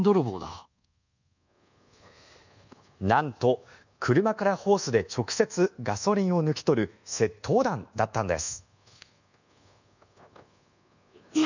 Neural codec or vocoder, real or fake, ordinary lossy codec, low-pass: codec, 24 kHz, 1.2 kbps, DualCodec; fake; none; 7.2 kHz